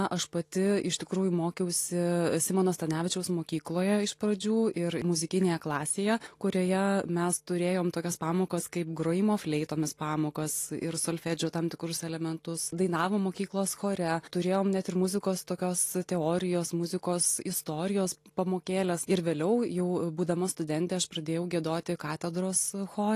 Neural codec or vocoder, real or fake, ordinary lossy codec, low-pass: none; real; AAC, 48 kbps; 14.4 kHz